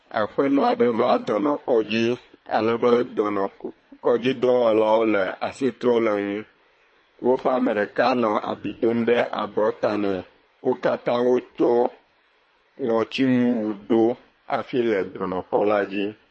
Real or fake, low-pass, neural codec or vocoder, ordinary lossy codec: fake; 9.9 kHz; codec, 24 kHz, 1 kbps, SNAC; MP3, 32 kbps